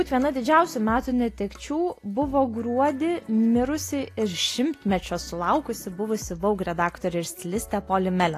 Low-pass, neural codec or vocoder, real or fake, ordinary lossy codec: 14.4 kHz; none; real; AAC, 48 kbps